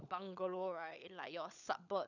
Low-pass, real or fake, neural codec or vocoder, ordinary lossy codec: 7.2 kHz; fake; codec, 16 kHz, 16 kbps, FunCodec, trained on LibriTTS, 50 frames a second; none